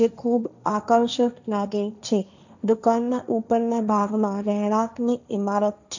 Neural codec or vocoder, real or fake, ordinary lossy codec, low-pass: codec, 16 kHz, 1.1 kbps, Voila-Tokenizer; fake; none; 7.2 kHz